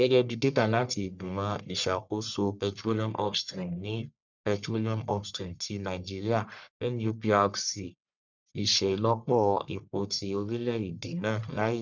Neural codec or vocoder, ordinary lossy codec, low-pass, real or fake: codec, 44.1 kHz, 1.7 kbps, Pupu-Codec; none; 7.2 kHz; fake